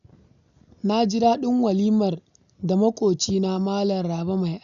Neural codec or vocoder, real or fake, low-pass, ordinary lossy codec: none; real; 7.2 kHz; none